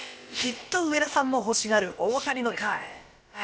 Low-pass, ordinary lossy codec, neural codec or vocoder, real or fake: none; none; codec, 16 kHz, about 1 kbps, DyCAST, with the encoder's durations; fake